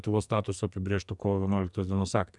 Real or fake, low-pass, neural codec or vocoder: fake; 10.8 kHz; codec, 44.1 kHz, 2.6 kbps, SNAC